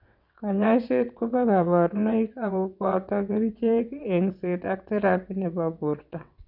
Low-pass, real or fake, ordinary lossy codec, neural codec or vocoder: 5.4 kHz; fake; none; vocoder, 22.05 kHz, 80 mel bands, WaveNeXt